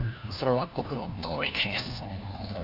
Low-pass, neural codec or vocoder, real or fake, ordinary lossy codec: 5.4 kHz; codec, 16 kHz, 1 kbps, FunCodec, trained on LibriTTS, 50 frames a second; fake; none